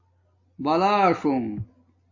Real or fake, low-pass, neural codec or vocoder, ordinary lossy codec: real; 7.2 kHz; none; AAC, 48 kbps